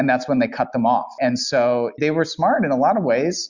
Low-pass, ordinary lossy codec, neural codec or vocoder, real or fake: 7.2 kHz; Opus, 64 kbps; none; real